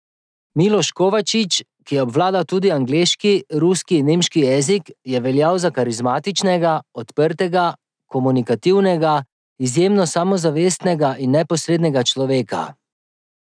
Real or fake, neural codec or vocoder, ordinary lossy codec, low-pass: real; none; none; 9.9 kHz